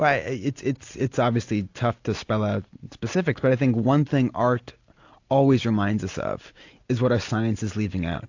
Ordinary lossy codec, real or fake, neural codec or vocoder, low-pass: AAC, 48 kbps; real; none; 7.2 kHz